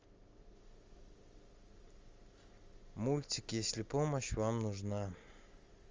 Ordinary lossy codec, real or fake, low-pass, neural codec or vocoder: Opus, 32 kbps; real; 7.2 kHz; none